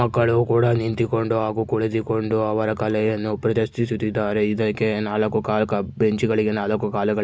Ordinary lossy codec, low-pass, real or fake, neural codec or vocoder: none; none; real; none